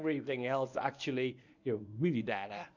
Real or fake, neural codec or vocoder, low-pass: fake; codec, 24 kHz, 0.9 kbps, WavTokenizer, medium speech release version 1; 7.2 kHz